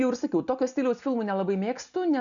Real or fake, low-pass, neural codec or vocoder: real; 7.2 kHz; none